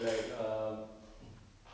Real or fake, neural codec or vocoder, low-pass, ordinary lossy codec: real; none; none; none